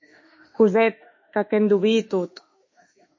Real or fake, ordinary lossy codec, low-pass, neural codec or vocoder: fake; MP3, 32 kbps; 7.2 kHz; autoencoder, 48 kHz, 32 numbers a frame, DAC-VAE, trained on Japanese speech